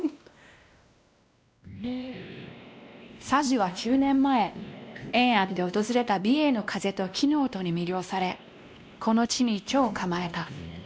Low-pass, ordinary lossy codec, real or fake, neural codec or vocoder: none; none; fake; codec, 16 kHz, 1 kbps, X-Codec, WavLM features, trained on Multilingual LibriSpeech